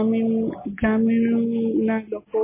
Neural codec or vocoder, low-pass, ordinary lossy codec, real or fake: none; 3.6 kHz; MP3, 16 kbps; real